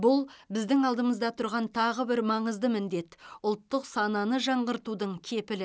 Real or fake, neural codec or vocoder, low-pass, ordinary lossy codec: real; none; none; none